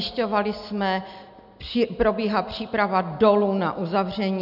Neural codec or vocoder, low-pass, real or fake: none; 5.4 kHz; real